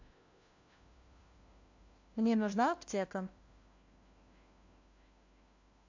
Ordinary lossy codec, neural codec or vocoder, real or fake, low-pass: AAC, 48 kbps; codec, 16 kHz, 1 kbps, FunCodec, trained on LibriTTS, 50 frames a second; fake; 7.2 kHz